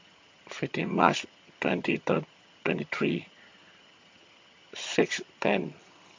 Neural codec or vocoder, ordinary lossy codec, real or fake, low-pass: vocoder, 22.05 kHz, 80 mel bands, HiFi-GAN; MP3, 48 kbps; fake; 7.2 kHz